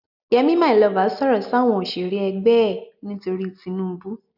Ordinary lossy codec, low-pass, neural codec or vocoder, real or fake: none; 5.4 kHz; none; real